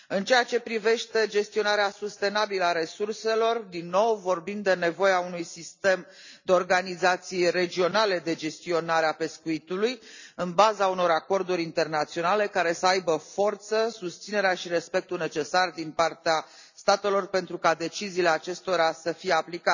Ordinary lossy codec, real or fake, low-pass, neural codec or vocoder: none; real; 7.2 kHz; none